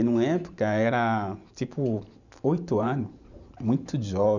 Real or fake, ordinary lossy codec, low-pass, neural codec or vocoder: fake; none; 7.2 kHz; vocoder, 44.1 kHz, 128 mel bands every 512 samples, BigVGAN v2